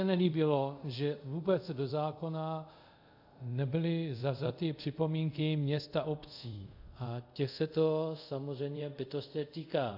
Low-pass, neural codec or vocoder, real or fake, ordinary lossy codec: 5.4 kHz; codec, 24 kHz, 0.5 kbps, DualCodec; fake; AAC, 48 kbps